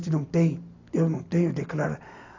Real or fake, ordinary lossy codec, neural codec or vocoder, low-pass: real; AAC, 48 kbps; none; 7.2 kHz